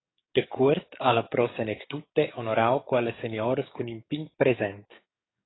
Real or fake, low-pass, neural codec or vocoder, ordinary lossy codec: fake; 7.2 kHz; codec, 44.1 kHz, 7.8 kbps, DAC; AAC, 16 kbps